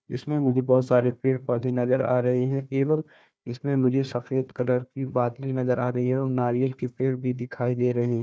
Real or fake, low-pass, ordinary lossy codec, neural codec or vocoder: fake; none; none; codec, 16 kHz, 1 kbps, FunCodec, trained on Chinese and English, 50 frames a second